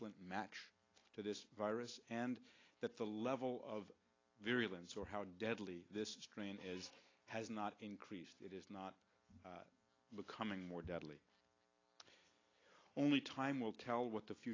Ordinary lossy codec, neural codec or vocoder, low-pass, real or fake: AAC, 32 kbps; none; 7.2 kHz; real